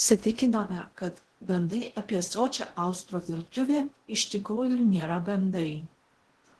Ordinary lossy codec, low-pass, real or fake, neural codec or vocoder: Opus, 16 kbps; 10.8 kHz; fake; codec, 16 kHz in and 24 kHz out, 0.6 kbps, FocalCodec, streaming, 2048 codes